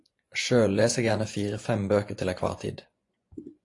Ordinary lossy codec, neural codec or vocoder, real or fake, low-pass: AAC, 48 kbps; vocoder, 24 kHz, 100 mel bands, Vocos; fake; 10.8 kHz